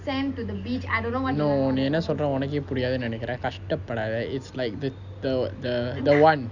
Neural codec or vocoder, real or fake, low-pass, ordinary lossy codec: none; real; 7.2 kHz; none